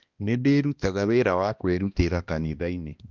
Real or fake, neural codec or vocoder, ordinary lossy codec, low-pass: fake; codec, 16 kHz, 1 kbps, X-Codec, HuBERT features, trained on balanced general audio; Opus, 24 kbps; 7.2 kHz